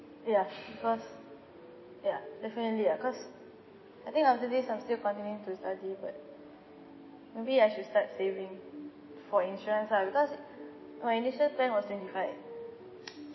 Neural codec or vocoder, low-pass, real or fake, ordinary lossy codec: none; 7.2 kHz; real; MP3, 24 kbps